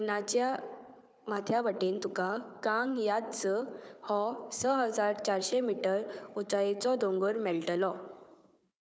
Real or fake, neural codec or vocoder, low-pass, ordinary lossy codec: fake; codec, 16 kHz, 4 kbps, FunCodec, trained on Chinese and English, 50 frames a second; none; none